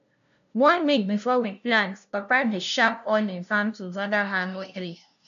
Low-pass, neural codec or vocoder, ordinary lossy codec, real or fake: 7.2 kHz; codec, 16 kHz, 0.5 kbps, FunCodec, trained on LibriTTS, 25 frames a second; none; fake